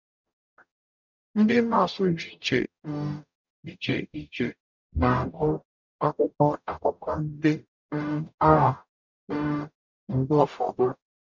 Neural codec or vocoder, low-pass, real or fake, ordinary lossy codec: codec, 44.1 kHz, 0.9 kbps, DAC; 7.2 kHz; fake; none